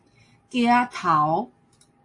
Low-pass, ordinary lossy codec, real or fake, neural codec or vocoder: 10.8 kHz; AAC, 48 kbps; real; none